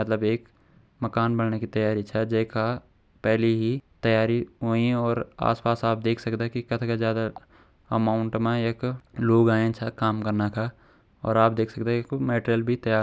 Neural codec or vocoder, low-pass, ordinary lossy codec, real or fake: none; none; none; real